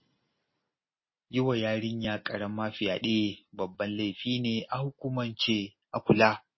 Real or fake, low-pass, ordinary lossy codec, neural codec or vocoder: real; 7.2 kHz; MP3, 24 kbps; none